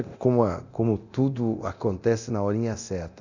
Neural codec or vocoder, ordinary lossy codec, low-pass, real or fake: codec, 24 kHz, 0.9 kbps, DualCodec; none; 7.2 kHz; fake